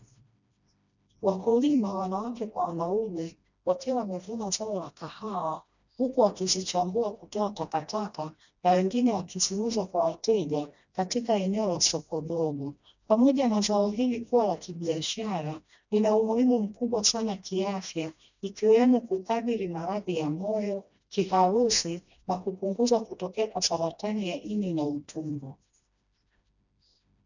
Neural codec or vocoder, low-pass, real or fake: codec, 16 kHz, 1 kbps, FreqCodec, smaller model; 7.2 kHz; fake